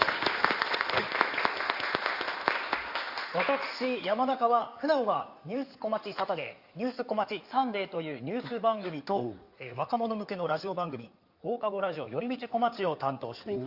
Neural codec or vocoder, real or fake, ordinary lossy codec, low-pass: codec, 16 kHz in and 24 kHz out, 2.2 kbps, FireRedTTS-2 codec; fake; Opus, 64 kbps; 5.4 kHz